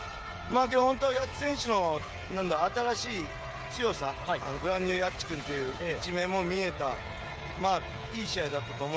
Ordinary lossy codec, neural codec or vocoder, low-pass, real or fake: none; codec, 16 kHz, 8 kbps, FreqCodec, smaller model; none; fake